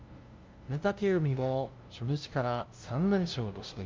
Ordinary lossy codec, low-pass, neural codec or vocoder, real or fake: Opus, 32 kbps; 7.2 kHz; codec, 16 kHz, 0.5 kbps, FunCodec, trained on LibriTTS, 25 frames a second; fake